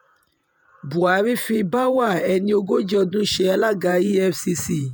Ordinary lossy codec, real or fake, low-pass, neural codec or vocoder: none; fake; none; vocoder, 48 kHz, 128 mel bands, Vocos